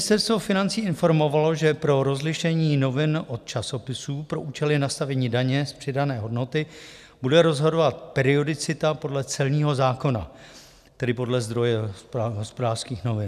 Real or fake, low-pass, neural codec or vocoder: real; 14.4 kHz; none